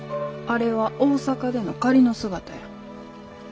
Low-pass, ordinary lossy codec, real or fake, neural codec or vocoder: none; none; real; none